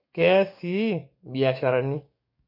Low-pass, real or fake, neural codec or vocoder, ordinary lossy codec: 5.4 kHz; fake; codec, 44.1 kHz, 7.8 kbps, DAC; MP3, 32 kbps